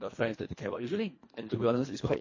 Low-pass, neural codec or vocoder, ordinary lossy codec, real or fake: 7.2 kHz; codec, 24 kHz, 1.5 kbps, HILCodec; MP3, 32 kbps; fake